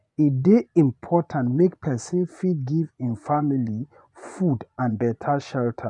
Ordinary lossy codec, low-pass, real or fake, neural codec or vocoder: none; 10.8 kHz; fake; vocoder, 24 kHz, 100 mel bands, Vocos